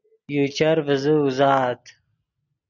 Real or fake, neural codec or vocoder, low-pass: real; none; 7.2 kHz